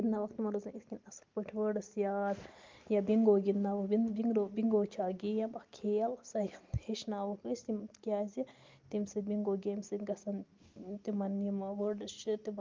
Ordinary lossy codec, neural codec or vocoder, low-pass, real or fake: Opus, 32 kbps; none; 7.2 kHz; real